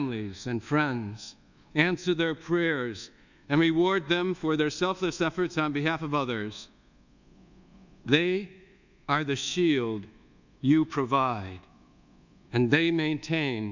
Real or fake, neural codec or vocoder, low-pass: fake; codec, 24 kHz, 1.2 kbps, DualCodec; 7.2 kHz